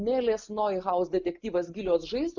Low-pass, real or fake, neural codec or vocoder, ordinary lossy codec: 7.2 kHz; real; none; MP3, 64 kbps